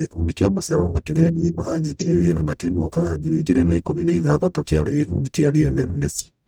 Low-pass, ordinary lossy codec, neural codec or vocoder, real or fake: none; none; codec, 44.1 kHz, 0.9 kbps, DAC; fake